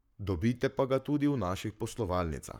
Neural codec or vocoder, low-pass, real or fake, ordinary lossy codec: codec, 44.1 kHz, 7.8 kbps, Pupu-Codec; 19.8 kHz; fake; none